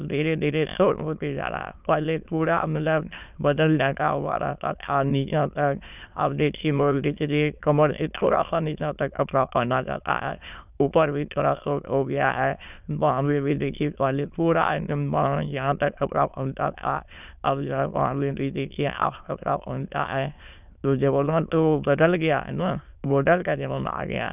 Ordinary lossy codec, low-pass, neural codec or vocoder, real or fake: none; 3.6 kHz; autoencoder, 22.05 kHz, a latent of 192 numbers a frame, VITS, trained on many speakers; fake